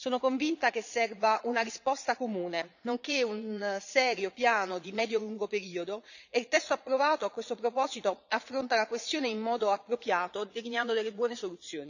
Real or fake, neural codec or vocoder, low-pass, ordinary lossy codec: fake; vocoder, 22.05 kHz, 80 mel bands, Vocos; 7.2 kHz; none